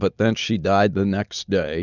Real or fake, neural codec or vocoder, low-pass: real; none; 7.2 kHz